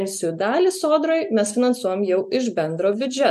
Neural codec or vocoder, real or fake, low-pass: none; real; 14.4 kHz